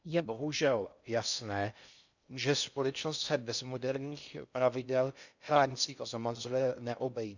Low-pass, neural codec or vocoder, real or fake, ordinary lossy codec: 7.2 kHz; codec, 16 kHz in and 24 kHz out, 0.6 kbps, FocalCodec, streaming, 2048 codes; fake; none